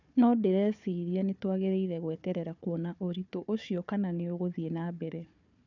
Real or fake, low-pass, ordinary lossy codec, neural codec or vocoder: fake; 7.2 kHz; none; codec, 16 kHz, 4 kbps, FunCodec, trained on Chinese and English, 50 frames a second